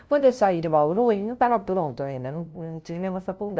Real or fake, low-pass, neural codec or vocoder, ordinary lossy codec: fake; none; codec, 16 kHz, 0.5 kbps, FunCodec, trained on LibriTTS, 25 frames a second; none